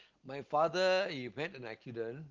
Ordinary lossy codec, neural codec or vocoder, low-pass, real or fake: Opus, 16 kbps; none; 7.2 kHz; real